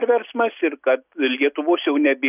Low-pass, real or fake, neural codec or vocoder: 3.6 kHz; real; none